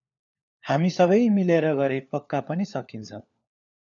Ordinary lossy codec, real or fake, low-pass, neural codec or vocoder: MP3, 96 kbps; fake; 7.2 kHz; codec, 16 kHz, 4 kbps, FunCodec, trained on LibriTTS, 50 frames a second